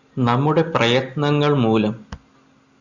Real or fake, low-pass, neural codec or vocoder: real; 7.2 kHz; none